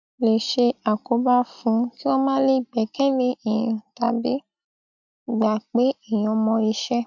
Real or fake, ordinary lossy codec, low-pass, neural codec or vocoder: real; none; 7.2 kHz; none